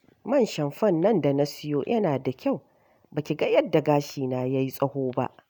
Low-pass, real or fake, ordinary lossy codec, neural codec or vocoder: none; real; none; none